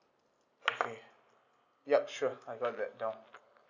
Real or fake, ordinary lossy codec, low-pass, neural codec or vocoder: real; none; 7.2 kHz; none